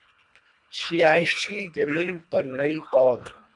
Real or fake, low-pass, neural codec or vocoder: fake; 10.8 kHz; codec, 24 kHz, 1.5 kbps, HILCodec